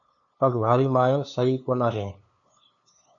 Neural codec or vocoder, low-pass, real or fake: codec, 16 kHz, 2 kbps, FunCodec, trained on LibriTTS, 25 frames a second; 7.2 kHz; fake